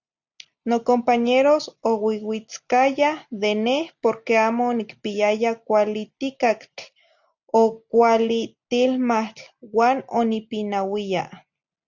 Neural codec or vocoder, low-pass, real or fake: none; 7.2 kHz; real